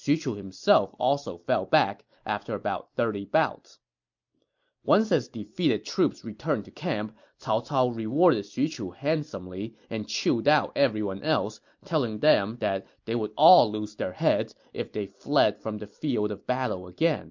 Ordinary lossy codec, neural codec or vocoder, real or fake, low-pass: MP3, 48 kbps; none; real; 7.2 kHz